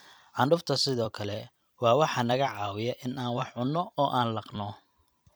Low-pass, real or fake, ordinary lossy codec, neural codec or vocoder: none; fake; none; vocoder, 44.1 kHz, 128 mel bands every 256 samples, BigVGAN v2